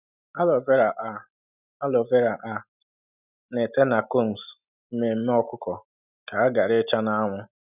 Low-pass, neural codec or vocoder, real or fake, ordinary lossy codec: 3.6 kHz; none; real; none